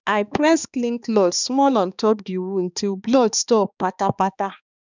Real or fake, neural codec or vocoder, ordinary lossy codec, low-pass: fake; codec, 16 kHz, 2 kbps, X-Codec, HuBERT features, trained on balanced general audio; none; 7.2 kHz